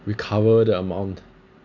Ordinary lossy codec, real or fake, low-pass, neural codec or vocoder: none; real; 7.2 kHz; none